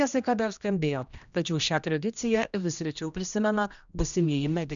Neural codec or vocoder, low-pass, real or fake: codec, 16 kHz, 1 kbps, X-Codec, HuBERT features, trained on general audio; 7.2 kHz; fake